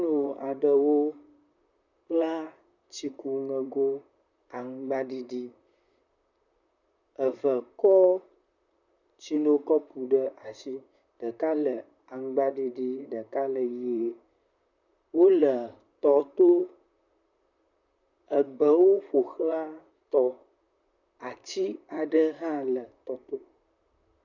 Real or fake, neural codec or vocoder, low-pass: fake; vocoder, 44.1 kHz, 128 mel bands, Pupu-Vocoder; 7.2 kHz